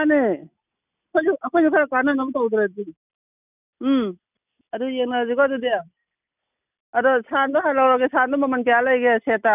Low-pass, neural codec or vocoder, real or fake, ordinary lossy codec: 3.6 kHz; none; real; none